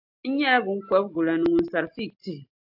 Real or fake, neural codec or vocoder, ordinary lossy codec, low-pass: real; none; AAC, 48 kbps; 5.4 kHz